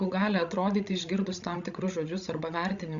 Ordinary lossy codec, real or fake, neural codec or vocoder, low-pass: Opus, 64 kbps; fake; codec, 16 kHz, 16 kbps, FreqCodec, larger model; 7.2 kHz